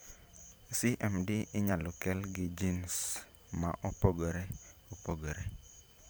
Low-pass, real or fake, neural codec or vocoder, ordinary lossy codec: none; fake; vocoder, 44.1 kHz, 128 mel bands every 512 samples, BigVGAN v2; none